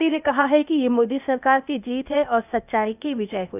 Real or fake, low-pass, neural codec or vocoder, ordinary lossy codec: fake; 3.6 kHz; codec, 16 kHz, 0.8 kbps, ZipCodec; none